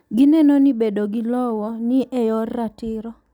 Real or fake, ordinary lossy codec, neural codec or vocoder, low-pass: real; none; none; 19.8 kHz